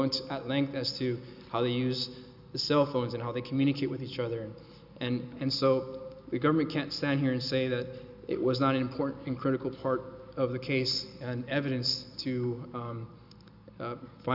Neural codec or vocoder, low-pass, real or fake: none; 5.4 kHz; real